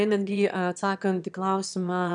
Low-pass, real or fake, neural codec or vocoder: 9.9 kHz; fake; autoencoder, 22.05 kHz, a latent of 192 numbers a frame, VITS, trained on one speaker